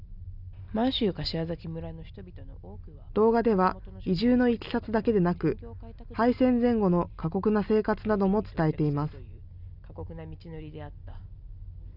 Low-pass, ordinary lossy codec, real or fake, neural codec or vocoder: 5.4 kHz; none; real; none